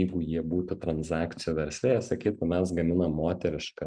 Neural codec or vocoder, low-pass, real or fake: none; 9.9 kHz; real